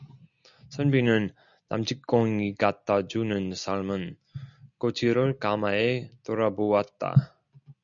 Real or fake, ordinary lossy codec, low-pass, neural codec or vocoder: real; MP3, 96 kbps; 7.2 kHz; none